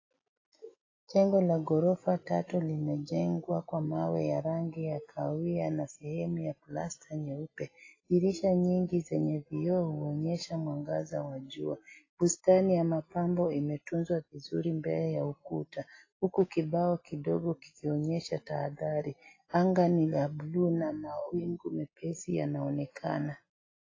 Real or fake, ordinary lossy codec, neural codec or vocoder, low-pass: real; AAC, 32 kbps; none; 7.2 kHz